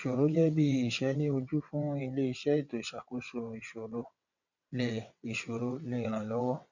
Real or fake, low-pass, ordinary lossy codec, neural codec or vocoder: fake; 7.2 kHz; none; vocoder, 22.05 kHz, 80 mel bands, WaveNeXt